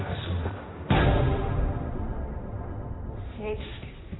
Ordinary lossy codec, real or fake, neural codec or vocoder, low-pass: AAC, 16 kbps; fake; codec, 16 kHz, 1.1 kbps, Voila-Tokenizer; 7.2 kHz